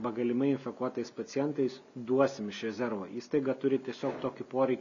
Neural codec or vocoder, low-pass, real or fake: none; 7.2 kHz; real